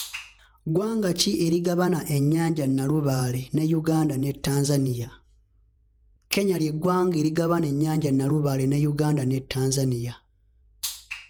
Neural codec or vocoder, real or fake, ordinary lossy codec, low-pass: vocoder, 48 kHz, 128 mel bands, Vocos; fake; none; none